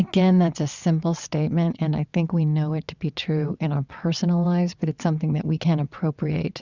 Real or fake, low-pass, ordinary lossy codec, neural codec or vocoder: fake; 7.2 kHz; Opus, 64 kbps; vocoder, 22.05 kHz, 80 mel bands, WaveNeXt